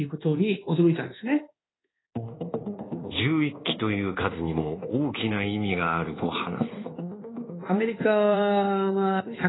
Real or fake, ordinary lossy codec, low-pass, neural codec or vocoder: fake; AAC, 16 kbps; 7.2 kHz; codec, 24 kHz, 1.2 kbps, DualCodec